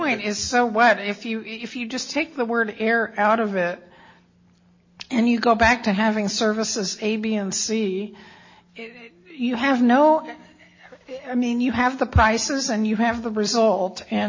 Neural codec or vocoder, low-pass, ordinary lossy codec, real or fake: none; 7.2 kHz; MP3, 32 kbps; real